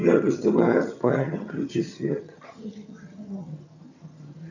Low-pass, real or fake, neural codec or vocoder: 7.2 kHz; fake; vocoder, 22.05 kHz, 80 mel bands, HiFi-GAN